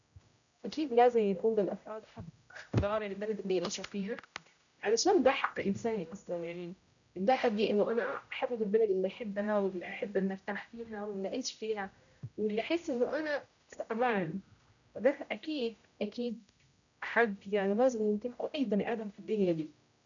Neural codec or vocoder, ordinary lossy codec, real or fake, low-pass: codec, 16 kHz, 0.5 kbps, X-Codec, HuBERT features, trained on general audio; none; fake; 7.2 kHz